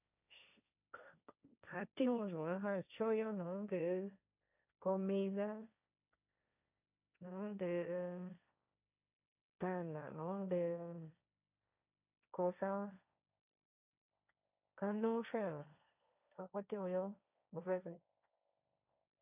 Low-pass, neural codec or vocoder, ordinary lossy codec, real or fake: 3.6 kHz; codec, 16 kHz, 1.1 kbps, Voila-Tokenizer; none; fake